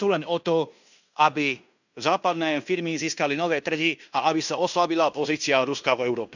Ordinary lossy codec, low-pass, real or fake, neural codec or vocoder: none; 7.2 kHz; fake; codec, 16 kHz in and 24 kHz out, 0.9 kbps, LongCat-Audio-Codec, fine tuned four codebook decoder